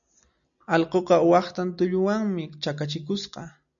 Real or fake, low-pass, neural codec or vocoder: real; 7.2 kHz; none